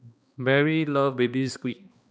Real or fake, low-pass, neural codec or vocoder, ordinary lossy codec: fake; none; codec, 16 kHz, 2 kbps, X-Codec, HuBERT features, trained on balanced general audio; none